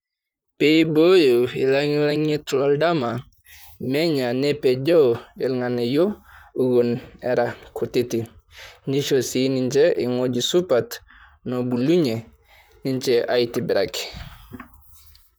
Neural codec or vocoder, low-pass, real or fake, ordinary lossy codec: vocoder, 44.1 kHz, 128 mel bands, Pupu-Vocoder; none; fake; none